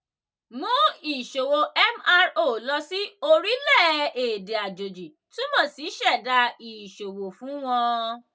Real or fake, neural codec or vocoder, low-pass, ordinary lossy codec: real; none; none; none